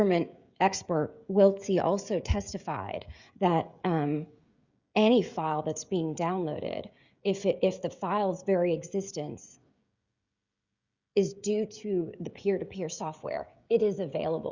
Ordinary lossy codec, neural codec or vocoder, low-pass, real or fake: Opus, 64 kbps; codec, 16 kHz, 16 kbps, FreqCodec, smaller model; 7.2 kHz; fake